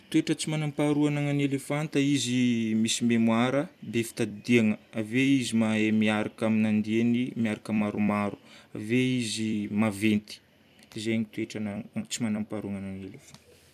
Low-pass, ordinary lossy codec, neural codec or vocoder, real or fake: 14.4 kHz; none; vocoder, 44.1 kHz, 128 mel bands every 256 samples, BigVGAN v2; fake